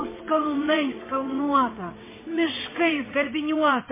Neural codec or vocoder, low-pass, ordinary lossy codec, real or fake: vocoder, 22.05 kHz, 80 mel bands, WaveNeXt; 3.6 kHz; MP3, 16 kbps; fake